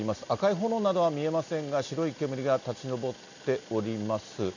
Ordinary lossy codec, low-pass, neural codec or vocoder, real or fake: none; 7.2 kHz; none; real